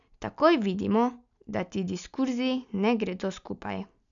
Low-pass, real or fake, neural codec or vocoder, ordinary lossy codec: 7.2 kHz; real; none; none